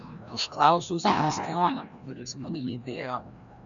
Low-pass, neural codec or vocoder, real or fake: 7.2 kHz; codec, 16 kHz, 1 kbps, FreqCodec, larger model; fake